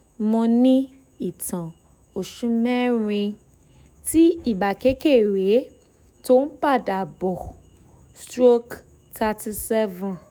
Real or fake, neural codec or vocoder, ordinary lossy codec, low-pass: fake; autoencoder, 48 kHz, 128 numbers a frame, DAC-VAE, trained on Japanese speech; none; none